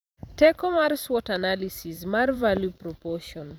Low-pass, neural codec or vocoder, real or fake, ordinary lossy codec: none; vocoder, 44.1 kHz, 128 mel bands every 512 samples, BigVGAN v2; fake; none